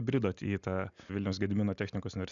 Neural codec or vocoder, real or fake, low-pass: none; real; 7.2 kHz